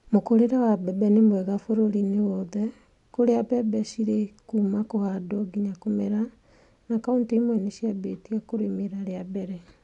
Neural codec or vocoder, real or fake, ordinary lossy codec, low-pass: none; real; none; 10.8 kHz